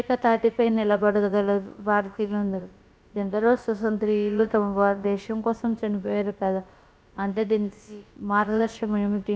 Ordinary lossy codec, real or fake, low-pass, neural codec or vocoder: none; fake; none; codec, 16 kHz, about 1 kbps, DyCAST, with the encoder's durations